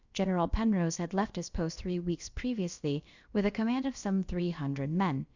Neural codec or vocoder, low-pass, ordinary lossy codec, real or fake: codec, 16 kHz, about 1 kbps, DyCAST, with the encoder's durations; 7.2 kHz; Opus, 64 kbps; fake